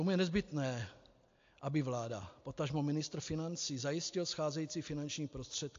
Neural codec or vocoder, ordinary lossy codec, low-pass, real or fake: none; AAC, 48 kbps; 7.2 kHz; real